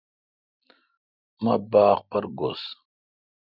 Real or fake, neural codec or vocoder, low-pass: real; none; 5.4 kHz